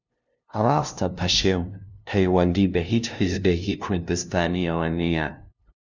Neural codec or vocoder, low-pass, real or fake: codec, 16 kHz, 0.5 kbps, FunCodec, trained on LibriTTS, 25 frames a second; 7.2 kHz; fake